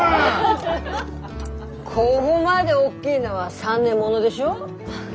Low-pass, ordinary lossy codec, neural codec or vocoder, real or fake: none; none; none; real